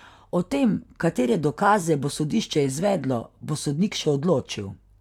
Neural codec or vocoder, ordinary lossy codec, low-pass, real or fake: vocoder, 44.1 kHz, 128 mel bands, Pupu-Vocoder; none; 19.8 kHz; fake